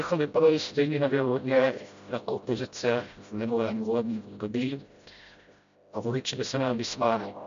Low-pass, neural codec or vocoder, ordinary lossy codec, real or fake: 7.2 kHz; codec, 16 kHz, 0.5 kbps, FreqCodec, smaller model; MP3, 64 kbps; fake